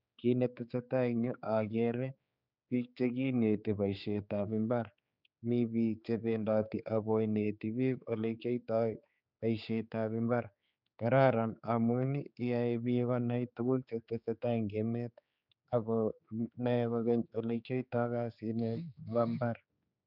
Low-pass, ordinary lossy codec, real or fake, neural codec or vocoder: 5.4 kHz; none; fake; codec, 16 kHz, 4 kbps, X-Codec, HuBERT features, trained on general audio